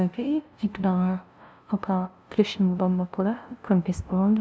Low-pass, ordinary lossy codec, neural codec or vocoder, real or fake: none; none; codec, 16 kHz, 0.5 kbps, FunCodec, trained on LibriTTS, 25 frames a second; fake